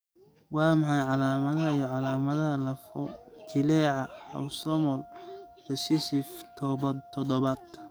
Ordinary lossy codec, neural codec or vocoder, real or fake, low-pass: none; codec, 44.1 kHz, 7.8 kbps, DAC; fake; none